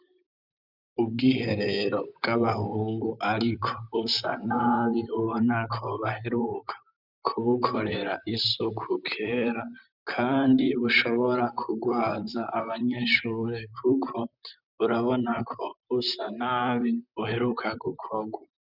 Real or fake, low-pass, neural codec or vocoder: fake; 5.4 kHz; vocoder, 44.1 kHz, 128 mel bands, Pupu-Vocoder